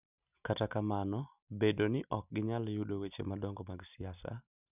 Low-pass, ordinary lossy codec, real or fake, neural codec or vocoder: 3.6 kHz; none; real; none